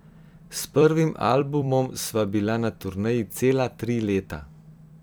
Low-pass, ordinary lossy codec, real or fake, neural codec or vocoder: none; none; fake; vocoder, 44.1 kHz, 128 mel bands every 256 samples, BigVGAN v2